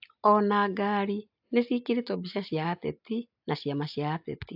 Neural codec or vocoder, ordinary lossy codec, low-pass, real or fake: none; AAC, 48 kbps; 5.4 kHz; real